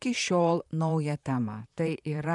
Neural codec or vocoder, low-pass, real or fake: vocoder, 44.1 kHz, 128 mel bands, Pupu-Vocoder; 10.8 kHz; fake